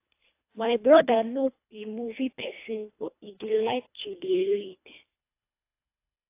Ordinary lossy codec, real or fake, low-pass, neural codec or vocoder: AAC, 24 kbps; fake; 3.6 kHz; codec, 24 kHz, 1.5 kbps, HILCodec